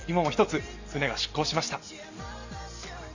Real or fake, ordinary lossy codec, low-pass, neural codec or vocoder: real; none; 7.2 kHz; none